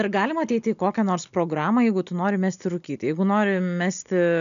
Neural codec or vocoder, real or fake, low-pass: none; real; 7.2 kHz